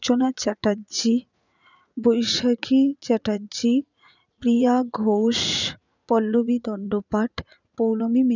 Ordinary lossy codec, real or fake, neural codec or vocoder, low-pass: none; fake; vocoder, 44.1 kHz, 80 mel bands, Vocos; 7.2 kHz